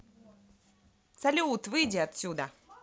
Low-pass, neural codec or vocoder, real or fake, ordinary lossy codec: none; none; real; none